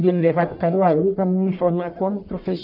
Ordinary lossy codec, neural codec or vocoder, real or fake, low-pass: none; codec, 44.1 kHz, 1.7 kbps, Pupu-Codec; fake; 5.4 kHz